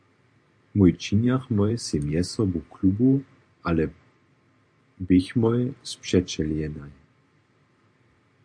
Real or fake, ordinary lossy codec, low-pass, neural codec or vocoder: fake; AAC, 64 kbps; 9.9 kHz; vocoder, 44.1 kHz, 128 mel bands every 512 samples, BigVGAN v2